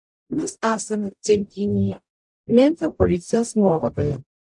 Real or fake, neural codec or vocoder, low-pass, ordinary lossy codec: fake; codec, 44.1 kHz, 0.9 kbps, DAC; 10.8 kHz; AAC, 64 kbps